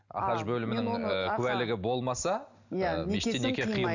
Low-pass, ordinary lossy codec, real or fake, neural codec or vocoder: 7.2 kHz; none; real; none